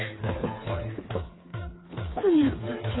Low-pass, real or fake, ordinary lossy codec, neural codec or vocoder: 7.2 kHz; fake; AAC, 16 kbps; codec, 16 kHz, 4 kbps, FreqCodec, smaller model